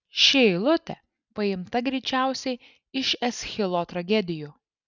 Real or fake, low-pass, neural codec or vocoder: real; 7.2 kHz; none